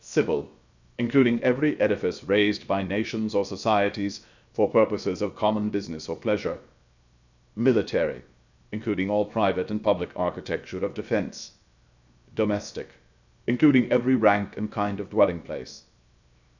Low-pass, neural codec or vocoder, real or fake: 7.2 kHz; codec, 16 kHz, 0.7 kbps, FocalCodec; fake